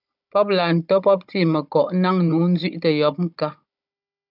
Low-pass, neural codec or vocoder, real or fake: 5.4 kHz; codec, 16 kHz, 16 kbps, FunCodec, trained on Chinese and English, 50 frames a second; fake